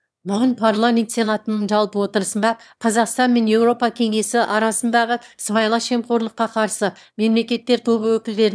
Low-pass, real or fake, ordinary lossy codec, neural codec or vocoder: none; fake; none; autoencoder, 22.05 kHz, a latent of 192 numbers a frame, VITS, trained on one speaker